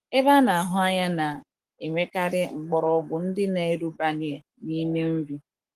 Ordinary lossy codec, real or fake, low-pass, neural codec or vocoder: Opus, 24 kbps; fake; 14.4 kHz; codec, 44.1 kHz, 7.8 kbps, Pupu-Codec